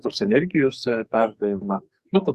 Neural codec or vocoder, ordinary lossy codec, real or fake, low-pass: codec, 44.1 kHz, 2.6 kbps, SNAC; Opus, 64 kbps; fake; 14.4 kHz